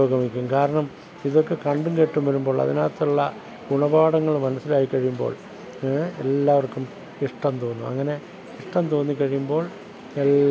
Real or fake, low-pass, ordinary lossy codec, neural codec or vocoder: real; none; none; none